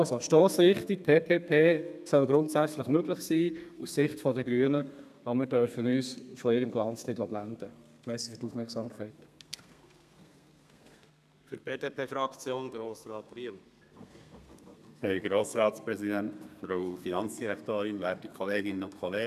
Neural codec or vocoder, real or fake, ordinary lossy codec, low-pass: codec, 32 kHz, 1.9 kbps, SNAC; fake; none; 14.4 kHz